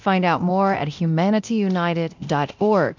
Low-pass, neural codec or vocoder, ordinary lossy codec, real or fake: 7.2 kHz; codec, 24 kHz, 0.9 kbps, DualCodec; MP3, 64 kbps; fake